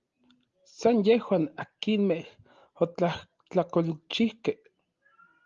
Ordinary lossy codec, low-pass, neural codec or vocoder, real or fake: Opus, 32 kbps; 7.2 kHz; none; real